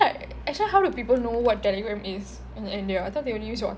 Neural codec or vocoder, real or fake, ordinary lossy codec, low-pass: none; real; none; none